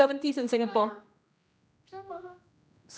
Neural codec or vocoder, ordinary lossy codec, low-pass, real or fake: codec, 16 kHz, 1 kbps, X-Codec, HuBERT features, trained on general audio; none; none; fake